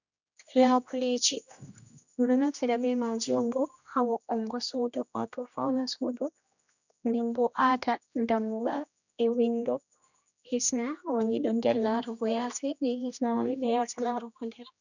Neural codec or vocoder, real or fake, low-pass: codec, 16 kHz, 1 kbps, X-Codec, HuBERT features, trained on general audio; fake; 7.2 kHz